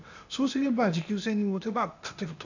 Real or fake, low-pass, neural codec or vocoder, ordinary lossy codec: fake; 7.2 kHz; codec, 16 kHz, 0.8 kbps, ZipCodec; none